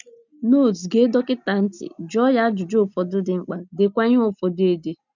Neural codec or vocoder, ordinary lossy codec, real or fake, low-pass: none; none; real; 7.2 kHz